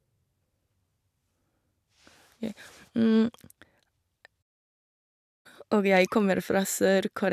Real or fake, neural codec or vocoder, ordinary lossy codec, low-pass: real; none; none; 14.4 kHz